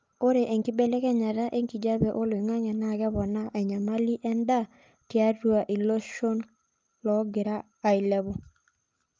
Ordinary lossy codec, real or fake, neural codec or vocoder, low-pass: Opus, 24 kbps; real; none; 7.2 kHz